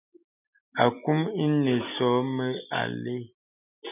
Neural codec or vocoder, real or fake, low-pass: none; real; 3.6 kHz